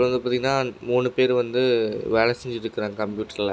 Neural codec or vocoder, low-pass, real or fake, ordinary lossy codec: none; none; real; none